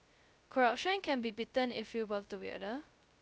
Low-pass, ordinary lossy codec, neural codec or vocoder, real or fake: none; none; codec, 16 kHz, 0.2 kbps, FocalCodec; fake